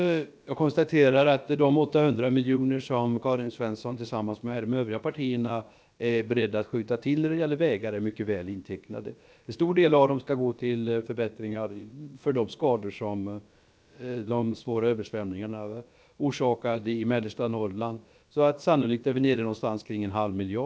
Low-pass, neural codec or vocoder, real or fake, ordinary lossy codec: none; codec, 16 kHz, about 1 kbps, DyCAST, with the encoder's durations; fake; none